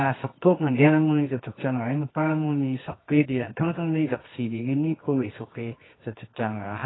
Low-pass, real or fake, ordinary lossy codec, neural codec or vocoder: 7.2 kHz; fake; AAC, 16 kbps; codec, 24 kHz, 0.9 kbps, WavTokenizer, medium music audio release